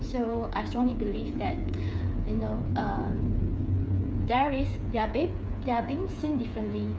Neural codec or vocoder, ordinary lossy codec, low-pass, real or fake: codec, 16 kHz, 8 kbps, FreqCodec, smaller model; none; none; fake